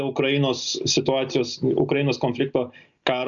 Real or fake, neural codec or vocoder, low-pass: real; none; 7.2 kHz